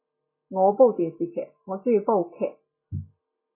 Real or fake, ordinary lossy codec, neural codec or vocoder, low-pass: real; MP3, 16 kbps; none; 3.6 kHz